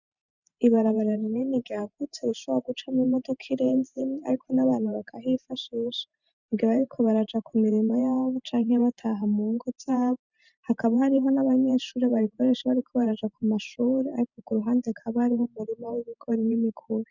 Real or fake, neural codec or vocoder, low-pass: fake; vocoder, 44.1 kHz, 128 mel bands every 512 samples, BigVGAN v2; 7.2 kHz